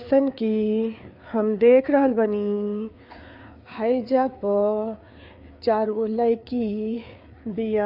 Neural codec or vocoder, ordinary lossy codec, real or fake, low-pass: codec, 16 kHz, 4 kbps, FreqCodec, larger model; none; fake; 5.4 kHz